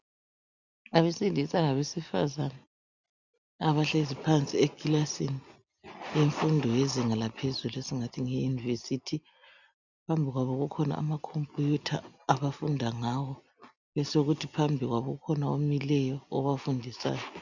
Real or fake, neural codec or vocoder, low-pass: real; none; 7.2 kHz